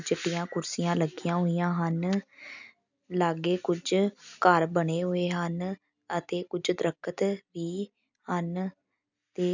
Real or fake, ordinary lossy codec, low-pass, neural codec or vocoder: real; none; 7.2 kHz; none